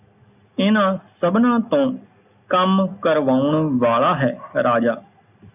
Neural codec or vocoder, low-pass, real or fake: none; 3.6 kHz; real